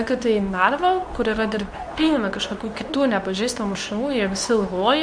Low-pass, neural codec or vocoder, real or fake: 9.9 kHz; codec, 24 kHz, 0.9 kbps, WavTokenizer, medium speech release version 2; fake